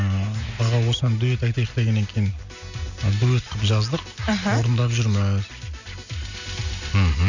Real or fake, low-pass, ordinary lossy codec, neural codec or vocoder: real; 7.2 kHz; none; none